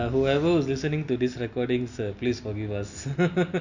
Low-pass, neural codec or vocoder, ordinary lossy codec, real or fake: 7.2 kHz; none; none; real